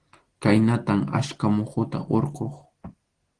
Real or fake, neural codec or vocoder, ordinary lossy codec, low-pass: real; none; Opus, 16 kbps; 9.9 kHz